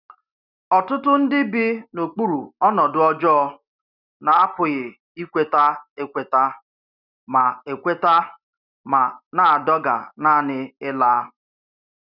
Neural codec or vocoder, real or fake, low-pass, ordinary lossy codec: none; real; 5.4 kHz; none